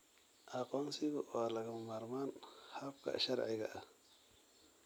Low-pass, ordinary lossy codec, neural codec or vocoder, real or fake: none; none; none; real